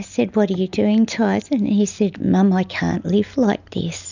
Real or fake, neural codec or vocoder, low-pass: real; none; 7.2 kHz